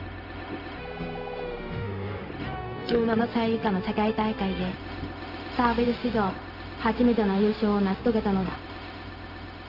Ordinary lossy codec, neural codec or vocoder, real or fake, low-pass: Opus, 32 kbps; codec, 16 kHz, 0.4 kbps, LongCat-Audio-Codec; fake; 5.4 kHz